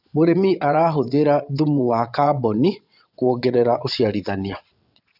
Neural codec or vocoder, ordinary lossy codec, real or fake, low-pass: vocoder, 22.05 kHz, 80 mel bands, WaveNeXt; none; fake; 5.4 kHz